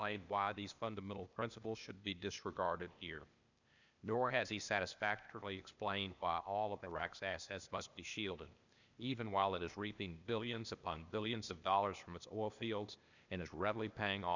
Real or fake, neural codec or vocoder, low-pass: fake; codec, 16 kHz, 0.8 kbps, ZipCodec; 7.2 kHz